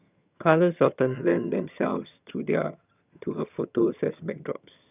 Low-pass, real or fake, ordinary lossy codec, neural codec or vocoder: 3.6 kHz; fake; none; vocoder, 22.05 kHz, 80 mel bands, HiFi-GAN